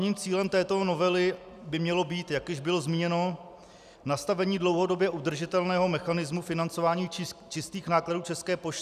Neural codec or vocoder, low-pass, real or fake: none; 14.4 kHz; real